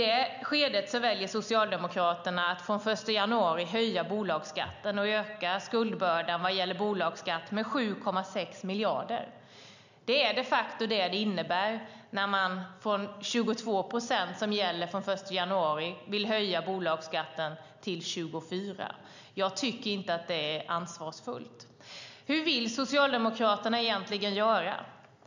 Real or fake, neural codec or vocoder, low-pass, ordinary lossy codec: real; none; 7.2 kHz; none